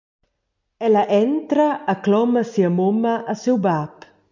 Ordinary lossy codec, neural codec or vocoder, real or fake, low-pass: MP3, 64 kbps; none; real; 7.2 kHz